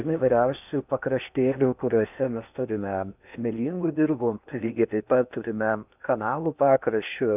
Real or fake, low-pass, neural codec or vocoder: fake; 3.6 kHz; codec, 16 kHz in and 24 kHz out, 0.8 kbps, FocalCodec, streaming, 65536 codes